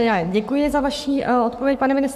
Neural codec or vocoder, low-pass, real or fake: codec, 44.1 kHz, 7.8 kbps, Pupu-Codec; 14.4 kHz; fake